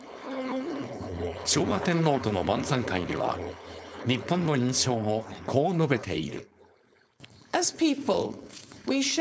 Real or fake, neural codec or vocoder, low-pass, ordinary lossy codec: fake; codec, 16 kHz, 4.8 kbps, FACodec; none; none